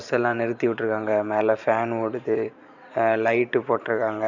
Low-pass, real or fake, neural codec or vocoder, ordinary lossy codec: 7.2 kHz; fake; vocoder, 44.1 kHz, 128 mel bands every 512 samples, BigVGAN v2; none